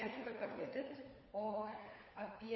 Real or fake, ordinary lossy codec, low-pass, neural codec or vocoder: fake; MP3, 24 kbps; 7.2 kHz; codec, 16 kHz, 4 kbps, FunCodec, trained on LibriTTS, 50 frames a second